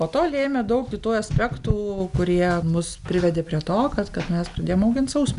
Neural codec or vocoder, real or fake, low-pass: none; real; 10.8 kHz